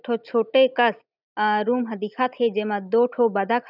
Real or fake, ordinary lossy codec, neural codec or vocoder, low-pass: real; none; none; 5.4 kHz